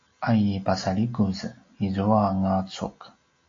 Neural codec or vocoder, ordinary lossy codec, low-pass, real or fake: none; AAC, 32 kbps; 7.2 kHz; real